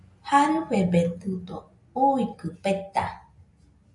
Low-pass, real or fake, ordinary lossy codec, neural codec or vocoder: 10.8 kHz; real; MP3, 96 kbps; none